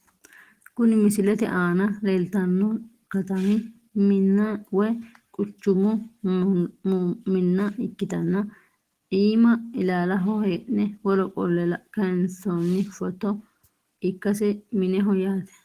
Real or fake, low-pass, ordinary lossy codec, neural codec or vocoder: real; 14.4 kHz; Opus, 16 kbps; none